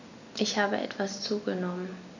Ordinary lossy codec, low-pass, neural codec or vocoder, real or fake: none; 7.2 kHz; none; real